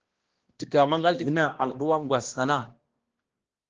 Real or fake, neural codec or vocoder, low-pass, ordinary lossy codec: fake; codec, 16 kHz, 1 kbps, X-Codec, HuBERT features, trained on balanced general audio; 7.2 kHz; Opus, 16 kbps